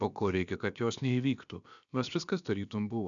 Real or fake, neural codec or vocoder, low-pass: fake; codec, 16 kHz, about 1 kbps, DyCAST, with the encoder's durations; 7.2 kHz